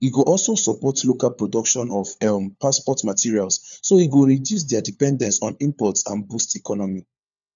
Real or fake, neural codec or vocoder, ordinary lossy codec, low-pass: fake; codec, 16 kHz, 4 kbps, FunCodec, trained on LibriTTS, 50 frames a second; none; 7.2 kHz